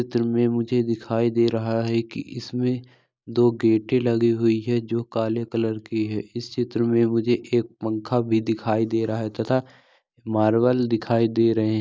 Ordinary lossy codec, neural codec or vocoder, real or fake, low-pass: none; none; real; none